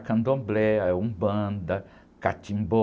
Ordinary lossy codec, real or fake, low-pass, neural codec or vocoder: none; real; none; none